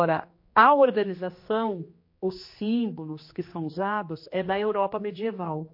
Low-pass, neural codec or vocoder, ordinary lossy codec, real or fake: 5.4 kHz; codec, 16 kHz, 2 kbps, X-Codec, HuBERT features, trained on general audio; MP3, 32 kbps; fake